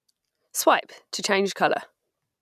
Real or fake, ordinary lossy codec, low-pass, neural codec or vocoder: real; none; 14.4 kHz; none